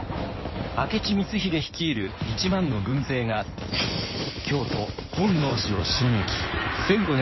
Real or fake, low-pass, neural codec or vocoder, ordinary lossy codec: fake; 7.2 kHz; codec, 16 kHz in and 24 kHz out, 2.2 kbps, FireRedTTS-2 codec; MP3, 24 kbps